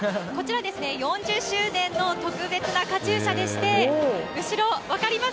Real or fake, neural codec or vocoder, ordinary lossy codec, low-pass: real; none; none; none